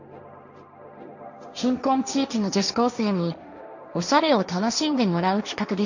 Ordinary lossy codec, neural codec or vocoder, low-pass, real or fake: none; codec, 16 kHz, 1.1 kbps, Voila-Tokenizer; 7.2 kHz; fake